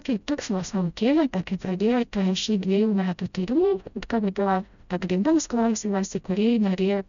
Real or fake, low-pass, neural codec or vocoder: fake; 7.2 kHz; codec, 16 kHz, 0.5 kbps, FreqCodec, smaller model